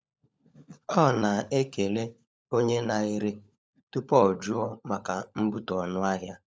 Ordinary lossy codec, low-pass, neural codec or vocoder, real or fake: none; none; codec, 16 kHz, 16 kbps, FunCodec, trained on LibriTTS, 50 frames a second; fake